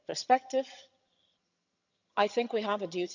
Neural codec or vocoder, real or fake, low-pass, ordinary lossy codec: vocoder, 22.05 kHz, 80 mel bands, HiFi-GAN; fake; 7.2 kHz; none